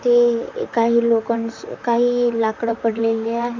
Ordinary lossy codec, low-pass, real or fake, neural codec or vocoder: MP3, 64 kbps; 7.2 kHz; fake; vocoder, 44.1 kHz, 128 mel bands, Pupu-Vocoder